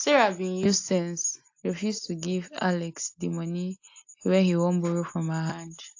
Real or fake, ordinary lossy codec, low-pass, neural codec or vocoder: real; AAC, 48 kbps; 7.2 kHz; none